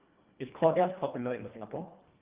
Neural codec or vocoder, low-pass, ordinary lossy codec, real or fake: codec, 24 kHz, 1.5 kbps, HILCodec; 3.6 kHz; Opus, 32 kbps; fake